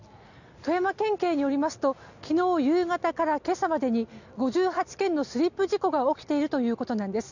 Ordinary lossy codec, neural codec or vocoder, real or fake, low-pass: none; none; real; 7.2 kHz